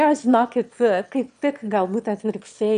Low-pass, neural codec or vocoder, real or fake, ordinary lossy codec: 9.9 kHz; autoencoder, 22.05 kHz, a latent of 192 numbers a frame, VITS, trained on one speaker; fake; MP3, 64 kbps